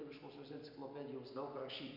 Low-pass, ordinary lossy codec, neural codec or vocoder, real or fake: 5.4 kHz; MP3, 48 kbps; none; real